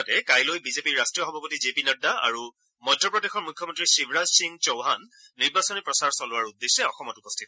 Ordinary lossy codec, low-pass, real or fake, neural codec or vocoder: none; none; real; none